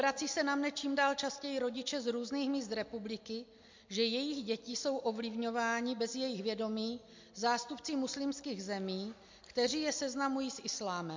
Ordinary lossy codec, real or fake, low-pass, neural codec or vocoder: MP3, 48 kbps; real; 7.2 kHz; none